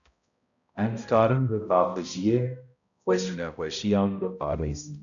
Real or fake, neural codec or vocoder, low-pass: fake; codec, 16 kHz, 0.5 kbps, X-Codec, HuBERT features, trained on balanced general audio; 7.2 kHz